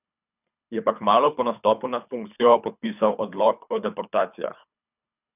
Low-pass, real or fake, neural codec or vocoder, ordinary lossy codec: 3.6 kHz; fake; codec, 24 kHz, 3 kbps, HILCodec; none